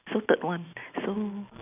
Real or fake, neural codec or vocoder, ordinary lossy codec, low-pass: real; none; none; 3.6 kHz